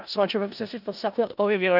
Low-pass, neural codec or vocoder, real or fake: 5.4 kHz; codec, 16 kHz in and 24 kHz out, 0.4 kbps, LongCat-Audio-Codec, four codebook decoder; fake